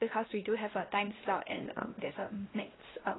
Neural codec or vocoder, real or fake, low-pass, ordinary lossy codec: codec, 16 kHz, 1 kbps, X-Codec, WavLM features, trained on Multilingual LibriSpeech; fake; 7.2 kHz; AAC, 16 kbps